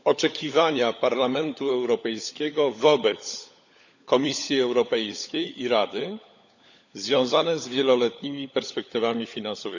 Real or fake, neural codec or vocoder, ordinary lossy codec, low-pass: fake; codec, 16 kHz, 16 kbps, FunCodec, trained on LibriTTS, 50 frames a second; none; 7.2 kHz